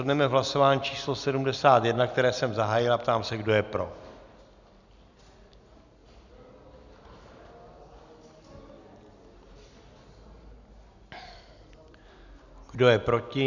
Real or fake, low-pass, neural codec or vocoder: real; 7.2 kHz; none